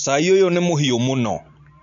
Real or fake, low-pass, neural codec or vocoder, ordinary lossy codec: real; 7.2 kHz; none; AAC, 64 kbps